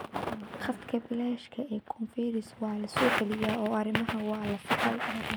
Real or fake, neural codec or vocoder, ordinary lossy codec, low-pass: real; none; none; none